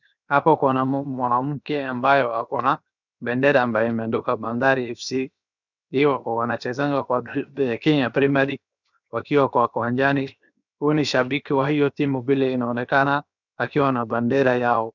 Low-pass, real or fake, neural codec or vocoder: 7.2 kHz; fake; codec, 16 kHz, 0.7 kbps, FocalCodec